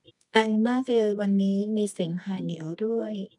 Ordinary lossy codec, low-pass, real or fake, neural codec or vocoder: none; 10.8 kHz; fake; codec, 24 kHz, 0.9 kbps, WavTokenizer, medium music audio release